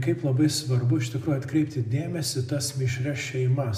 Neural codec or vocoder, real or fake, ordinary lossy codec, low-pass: none; real; AAC, 96 kbps; 14.4 kHz